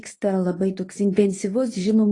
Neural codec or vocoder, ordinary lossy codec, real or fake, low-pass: codec, 24 kHz, 0.9 kbps, WavTokenizer, medium speech release version 1; AAC, 32 kbps; fake; 10.8 kHz